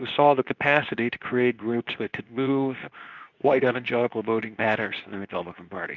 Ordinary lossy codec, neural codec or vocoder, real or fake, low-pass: Opus, 64 kbps; codec, 24 kHz, 0.9 kbps, WavTokenizer, medium speech release version 2; fake; 7.2 kHz